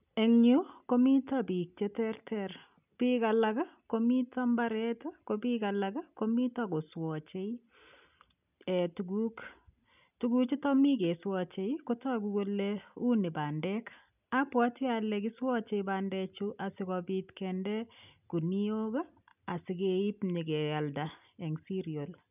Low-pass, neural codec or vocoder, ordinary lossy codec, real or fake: 3.6 kHz; none; none; real